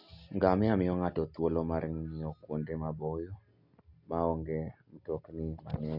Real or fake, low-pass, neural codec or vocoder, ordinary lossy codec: real; 5.4 kHz; none; none